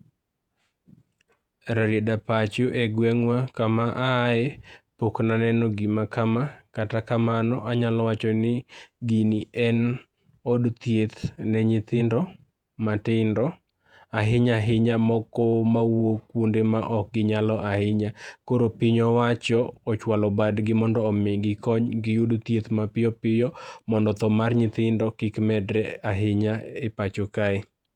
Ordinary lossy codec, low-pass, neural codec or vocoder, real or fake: none; 19.8 kHz; vocoder, 48 kHz, 128 mel bands, Vocos; fake